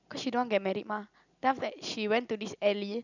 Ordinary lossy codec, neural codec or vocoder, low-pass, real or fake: none; none; 7.2 kHz; real